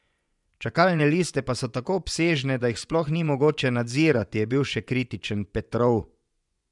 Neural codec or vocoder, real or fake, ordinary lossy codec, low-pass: vocoder, 24 kHz, 100 mel bands, Vocos; fake; none; 10.8 kHz